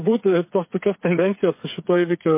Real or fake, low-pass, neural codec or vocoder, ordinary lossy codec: fake; 3.6 kHz; codec, 16 kHz, 8 kbps, FreqCodec, smaller model; MP3, 24 kbps